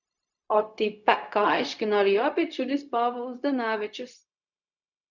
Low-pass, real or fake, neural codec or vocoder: 7.2 kHz; fake; codec, 16 kHz, 0.4 kbps, LongCat-Audio-Codec